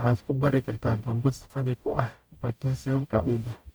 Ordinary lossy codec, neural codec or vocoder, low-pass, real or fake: none; codec, 44.1 kHz, 0.9 kbps, DAC; none; fake